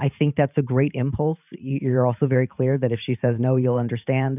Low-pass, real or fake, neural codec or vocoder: 3.6 kHz; fake; vocoder, 44.1 kHz, 128 mel bands every 512 samples, BigVGAN v2